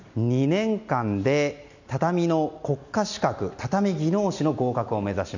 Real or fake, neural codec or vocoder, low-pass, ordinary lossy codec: real; none; 7.2 kHz; none